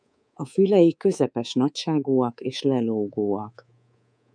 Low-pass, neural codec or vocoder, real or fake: 9.9 kHz; codec, 24 kHz, 3.1 kbps, DualCodec; fake